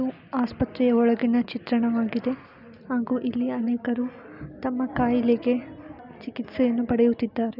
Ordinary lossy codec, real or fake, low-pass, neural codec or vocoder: none; real; 5.4 kHz; none